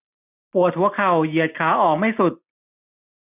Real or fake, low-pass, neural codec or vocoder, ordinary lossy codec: real; 3.6 kHz; none; none